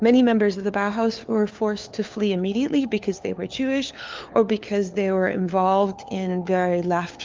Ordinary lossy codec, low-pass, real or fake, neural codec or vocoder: Opus, 24 kbps; 7.2 kHz; fake; codec, 16 kHz, 4 kbps, FunCodec, trained on LibriTTS, 50 frames a second